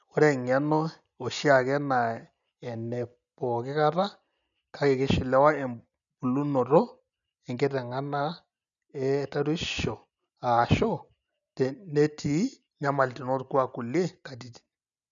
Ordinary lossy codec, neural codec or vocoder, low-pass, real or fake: none; none; 7.2 kHz; real